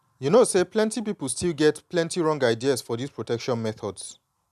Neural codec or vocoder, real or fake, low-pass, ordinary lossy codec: none; real; 14.4 kHz; none